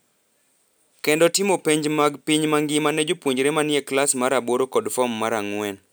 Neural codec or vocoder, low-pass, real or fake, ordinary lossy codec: none; none; real; none